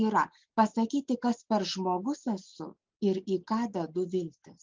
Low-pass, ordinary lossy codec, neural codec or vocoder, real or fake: 7.2 kHz; Opus, 24 kbps; none; real